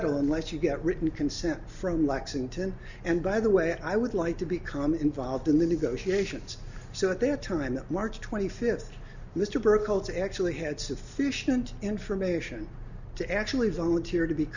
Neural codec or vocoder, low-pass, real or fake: none; 7.2 kHz; real